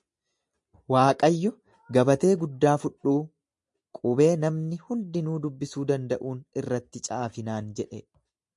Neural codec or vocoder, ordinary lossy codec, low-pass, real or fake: none; MP3, 64 kbps; 10.8 kHz; real